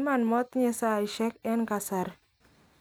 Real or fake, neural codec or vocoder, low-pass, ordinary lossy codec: real; none; none; none